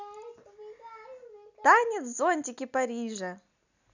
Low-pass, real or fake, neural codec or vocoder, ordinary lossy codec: 7.2 kHz; real; none; none